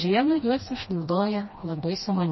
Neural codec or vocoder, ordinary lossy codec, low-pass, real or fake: codec, 16 kHz, 1 kbps, FreqCodec, smaller model; MP3, 24 kbps; 7.2 kHz; fake